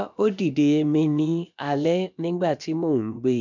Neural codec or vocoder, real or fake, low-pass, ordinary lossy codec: codec, 16 kHz, about 1 kbps, DyCAST, with the encoder's durations; fake; 7.2 kHz; none